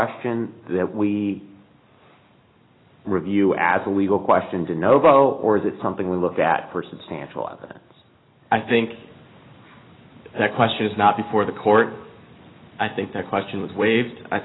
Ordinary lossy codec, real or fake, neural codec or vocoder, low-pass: AAC, 16 kbps; real; none; 7.2 kHz